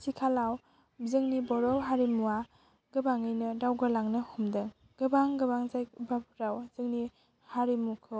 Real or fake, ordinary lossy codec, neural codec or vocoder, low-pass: real; none; none; none